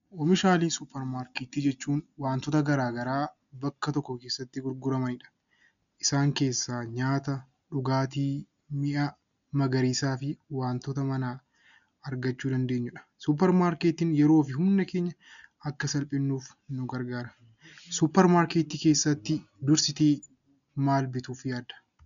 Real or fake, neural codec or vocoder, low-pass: real; none; 7.2 kHz